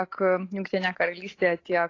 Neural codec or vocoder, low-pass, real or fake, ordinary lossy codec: none; 7.2 kHz; real; AAC, 32 kbps